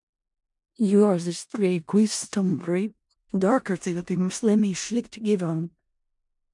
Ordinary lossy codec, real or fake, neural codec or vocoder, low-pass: MP3, 64 kbps; fake; codec, 16 kHz in and 24 kHz out, 0.4 kbps, LongCat-Audio-Codec, four codebook decoder; 10.8 kHz